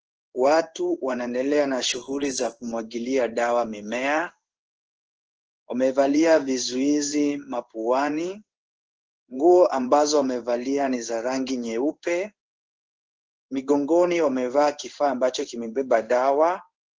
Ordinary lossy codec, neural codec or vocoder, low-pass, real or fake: Opus, 16 kbps; codec, 16 kHz in and 24 kHz out, 1 kbps, XY-Tokenizer; 7.2 kHz; fake